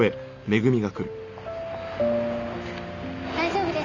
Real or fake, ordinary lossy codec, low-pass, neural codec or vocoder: real; none; 7.2 kHz; none